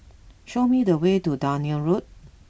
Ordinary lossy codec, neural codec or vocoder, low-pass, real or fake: none; none; none; real